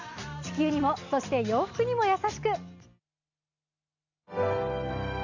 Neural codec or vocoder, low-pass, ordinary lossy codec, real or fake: none; 7.2 kHz; none; real